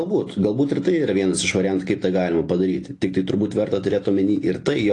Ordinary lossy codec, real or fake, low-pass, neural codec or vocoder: AAC, 48 kbps; real; 10.8 kHz; none